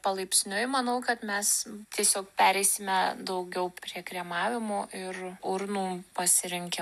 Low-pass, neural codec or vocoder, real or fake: 14.4 kHz; none; real